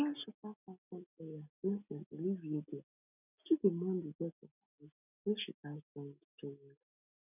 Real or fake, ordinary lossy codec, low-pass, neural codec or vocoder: real; none; 3.6 kHz; none